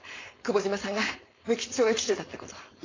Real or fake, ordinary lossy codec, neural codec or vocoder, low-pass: fake; AAC, 32 kbps; codec, 16 kHz, 4.8 kbps, FACodec; 7.2 kHz